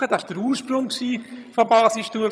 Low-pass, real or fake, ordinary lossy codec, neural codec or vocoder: none; fake; none; vocoder, 22.05 kHz, 80 mel bands, HiFi-GAN